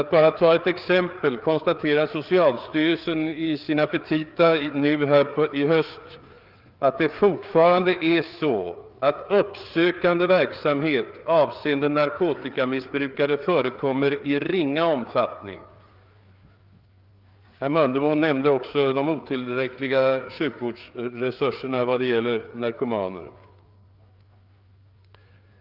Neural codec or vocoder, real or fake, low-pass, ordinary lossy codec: codec, 16 kHz, 4 kbps, FreqCodec, larger model; fake; 5.4 kHz; Opus, 32 kbps